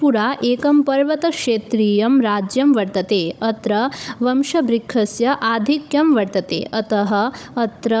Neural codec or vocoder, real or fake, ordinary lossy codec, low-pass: codec, 16 kHz, 16 kbps, FunCodec, trained on Chinese and English, 50 frames a second; fake; none; none